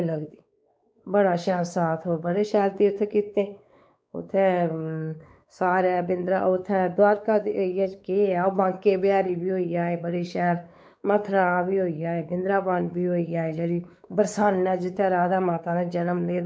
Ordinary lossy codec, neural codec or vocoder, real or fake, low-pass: none; codec, 16 kHz, 4 kbps, X-Codec, WavLM features, trained on Multilingual LibriSpeech; fake; none